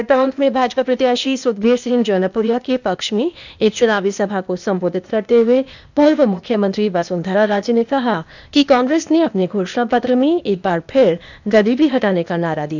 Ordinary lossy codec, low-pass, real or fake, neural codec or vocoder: none; 7.2 kHz; fake; codec, 16 kHz, 0.8 kbps, ZipCodec